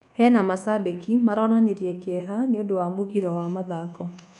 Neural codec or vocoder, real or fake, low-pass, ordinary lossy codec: codec, 24 kHz, 1.2 kbps, DualCodec; fake; 10.8 kHz; none